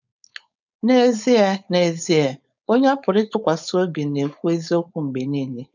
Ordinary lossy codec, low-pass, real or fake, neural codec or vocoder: none; 7.2 kHz; fake; codec, 16 kHz, 4.8 kbps, FACodec